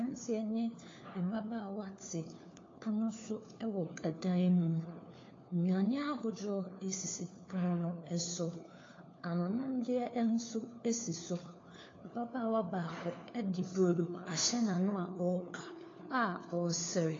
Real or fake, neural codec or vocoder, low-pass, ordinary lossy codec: fake; codec, 16 kHz, 4 kbps, FunCodec, trained on LibriTTS, 50 frames a second; 7.2 kHz; AAC, 32 kbps